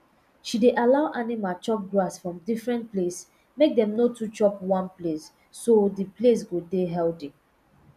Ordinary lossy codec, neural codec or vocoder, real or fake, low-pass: none; none; real; 14.4 kHz